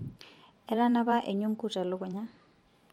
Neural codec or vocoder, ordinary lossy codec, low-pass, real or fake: vocoder, 44.1 kHz, 128 mel bands every 512 samples, BigVGAN v2; MP3, 64 kbps; 19.8 kHz; fake